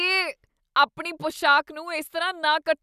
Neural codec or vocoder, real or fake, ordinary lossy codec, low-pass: none; real; none; 14.4 kHz